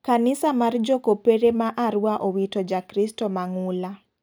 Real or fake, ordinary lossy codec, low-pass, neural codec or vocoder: real; none; none; none